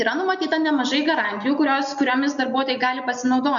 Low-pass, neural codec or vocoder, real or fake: 7.2 kHz; none; real